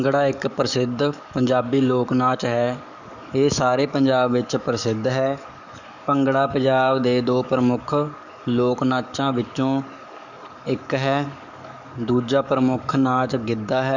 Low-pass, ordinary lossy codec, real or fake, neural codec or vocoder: 7.2 kHz; none; fake; codec, 44.1 kHz, 7.8 kbps, DAC